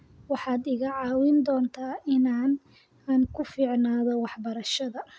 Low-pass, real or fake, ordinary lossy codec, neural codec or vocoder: none; real; none; none